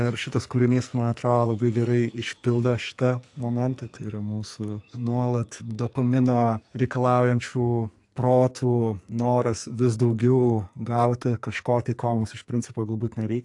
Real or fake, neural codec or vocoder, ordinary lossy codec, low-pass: fake; codec, 44.1 kHz, 2.6 kbps, SNAC; AAC, 64 kbps; 10.8 kHz